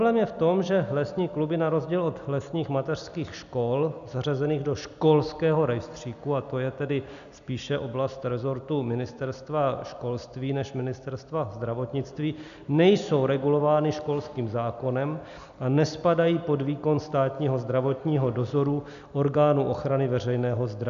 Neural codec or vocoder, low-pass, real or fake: none; 7.2 kHz; real